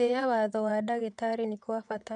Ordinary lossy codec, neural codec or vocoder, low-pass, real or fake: none; vocoder, 22.05 kHz, 80 mel bands, WaveNeXt; 9.9 kHz; fake